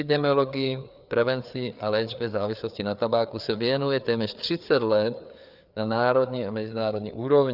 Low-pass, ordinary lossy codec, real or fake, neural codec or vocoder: 5.4 kHz; Opus, 64 kbps; fake; codec, 16 kHz, 4 kbps, FreqCodec, larger model